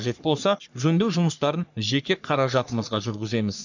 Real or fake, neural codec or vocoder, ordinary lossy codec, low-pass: fake; codec, 44.1 kHz, 3.4 kbps, Pupu-Codec; none; 7.2 kHz